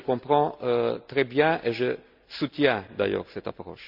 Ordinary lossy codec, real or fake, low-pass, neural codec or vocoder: Opus, 64 kbps; real; 5.4 kHz; none